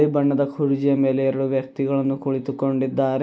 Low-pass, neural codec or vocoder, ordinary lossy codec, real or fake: none; none; none; real